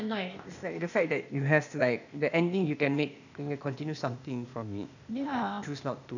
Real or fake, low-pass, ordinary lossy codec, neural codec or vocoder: fake; 7.2 kHz; none; codec, 16 kHz, 0.8 kbps, ZipCodec